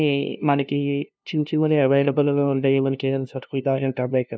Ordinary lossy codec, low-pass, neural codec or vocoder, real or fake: none; none; codec, 16 kHz, 0.5 kbps, FunCodec, trained on LibriTTS, 25 frames a second; fake